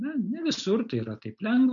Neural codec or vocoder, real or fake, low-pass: none; real; 7.2 kHz